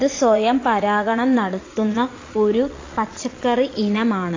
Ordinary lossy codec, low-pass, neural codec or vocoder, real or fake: AAC, 32 kbps; 7.2 kHz; vocoder, 22.05 kHz, 80 mel bands, Vocos; fake